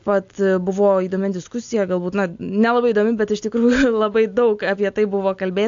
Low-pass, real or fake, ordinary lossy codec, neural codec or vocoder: 7.2 kHz; real; AAC, 64 kbps; none